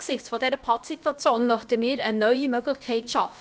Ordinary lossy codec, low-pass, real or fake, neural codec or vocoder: none; none; fake; codec, 16 kHz, about 1 kbps, DyCAST, with the encoder's durations